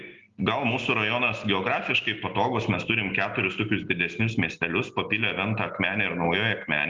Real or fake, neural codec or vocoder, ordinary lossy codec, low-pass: real; none; Opus, 32 kbps; 7.2 kHz